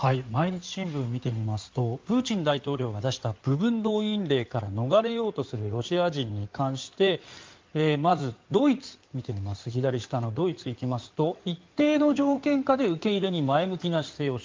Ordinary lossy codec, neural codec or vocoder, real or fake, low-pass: Opus, 32 kbps; codec, 16 kHz in and 24 kHz out, 2.2 kbps, FireRedTTS-2 codec; fake; 7.2 kHz